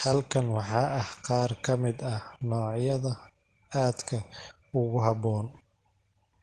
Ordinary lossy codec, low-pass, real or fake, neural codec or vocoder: Opus, 16 kbps; 10.8 kHz; real; none